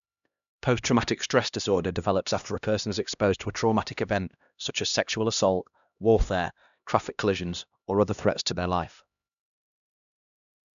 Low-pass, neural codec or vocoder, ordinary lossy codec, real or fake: 7.2 kHz; codec, 16 kHz, 1 kbps, X-Codec, HuBERT features, trained on LibriSpeech; MP3, 96 kbps; fake